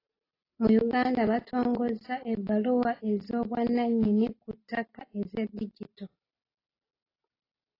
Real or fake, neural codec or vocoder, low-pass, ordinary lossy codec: real; none; 5.4 kHz; AAC, 24 kbps